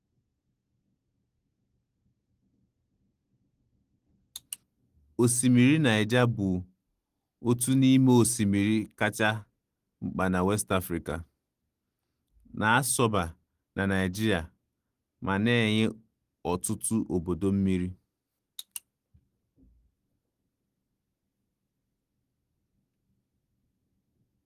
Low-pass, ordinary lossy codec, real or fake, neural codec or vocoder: 14.4 kHz; Opus, 24 kbps; real; none